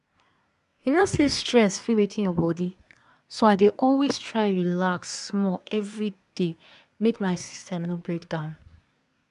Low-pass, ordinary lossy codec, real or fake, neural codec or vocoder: 10.8 kHz; none; fake; codec, 24 kHz, 1 kbps, SNAC